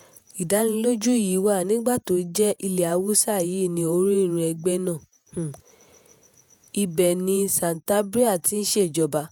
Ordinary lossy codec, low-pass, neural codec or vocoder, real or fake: none; none; vocoder, 48 kHz, 128 mel bands, Vocos; fake